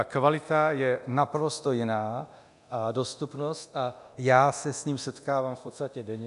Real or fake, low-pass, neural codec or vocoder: fake; 10.8 kHz; codec, 24 kHz, 0.9 kbps, DualCodec